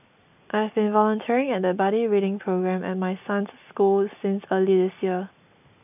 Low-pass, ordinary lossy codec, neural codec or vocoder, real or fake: 3.6 kHz; none; none; real